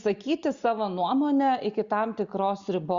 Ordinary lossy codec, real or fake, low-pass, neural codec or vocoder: Opus, 64 kbps; real; 7.2 kHz; none